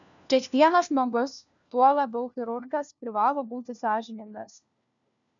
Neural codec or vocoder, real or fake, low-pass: codec, 16 kHz, 1 kbps, FunCodec, trained on LibriTTS, 50 frames a second; fake; 7.2 kHz